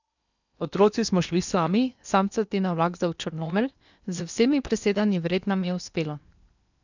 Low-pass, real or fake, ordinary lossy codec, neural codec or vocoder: 7.2 kHz; fake; none; codec, 16 kHz in and 24 kHz out, 0.8 kbps, FocalCodec, streaming, 65536 codes